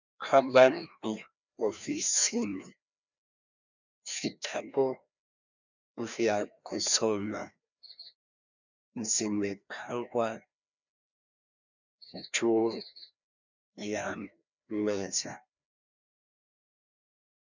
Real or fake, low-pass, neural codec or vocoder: fake; 7.2 kHz; codec, 16 kHz, 1 kbps, FreqCodec, larger model